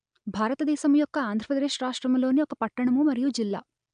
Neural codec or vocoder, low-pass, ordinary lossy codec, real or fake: none; 9.9 kHz; AAC, 96 kbps; real